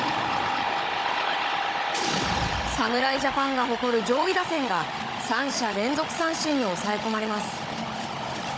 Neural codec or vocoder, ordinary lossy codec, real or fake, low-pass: codec, 16 kHz, 16 kbps, FunCodec, trained on Chinese and English, 50 frames a second; none; fake; none